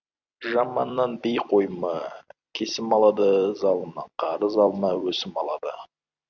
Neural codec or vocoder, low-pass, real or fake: vocoder, 44.1 kHz, 128 mel bands every 256 samples, BigVGAN v2; 7.2 kHz; fake